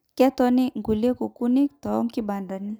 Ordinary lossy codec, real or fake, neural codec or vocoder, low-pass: none; real; none; none